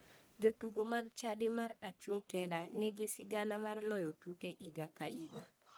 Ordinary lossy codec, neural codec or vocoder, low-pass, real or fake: none; codec, 44.1 kHz, 1.7 kbps, Pupu-Codec; none; fake